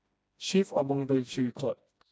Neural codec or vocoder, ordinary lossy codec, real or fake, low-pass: codec, 16 kHz, 1 kbps, FreqCodec, smaller model; none; fake; none